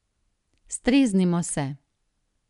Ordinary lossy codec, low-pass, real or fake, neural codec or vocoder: none; 10.8 kHz; real; none